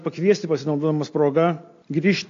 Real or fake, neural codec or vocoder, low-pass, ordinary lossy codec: real; none; 7.2 kHz; AAC, 48 kbps